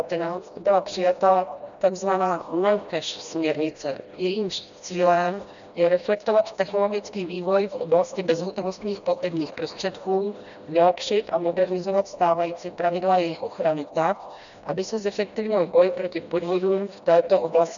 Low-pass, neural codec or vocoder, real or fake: 7.2 kHz; codec, 16 kHz, 1 kbps, FreqCodec, smaller model; fake